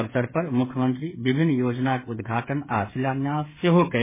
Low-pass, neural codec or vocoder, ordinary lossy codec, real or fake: 3.6 kHz; codec, 16 kHz, 8 kbps, FreqCodec, smaller model; MP3, 16 kbps; fake